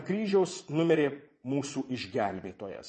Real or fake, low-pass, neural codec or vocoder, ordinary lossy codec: fake; 9.9 kHz; vocoder, 22.05 kHz, 80 mel bands, Vocos; MP3, 32 kbps